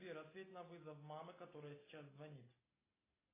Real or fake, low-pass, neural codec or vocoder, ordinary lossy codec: real; 3.6 kHz; none; AAC, 16 kbps